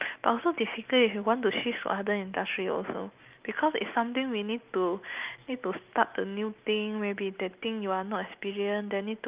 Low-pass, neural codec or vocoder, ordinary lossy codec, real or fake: 3.6 kHz; none; Opus, 24 kbps; real